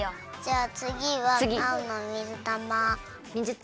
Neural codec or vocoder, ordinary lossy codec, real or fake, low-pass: none; none; real; none